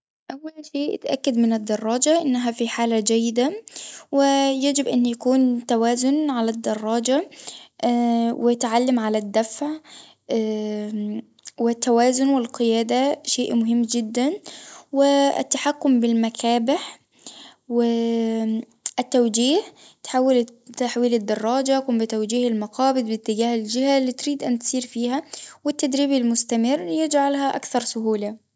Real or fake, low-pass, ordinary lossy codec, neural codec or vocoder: real; none; none; none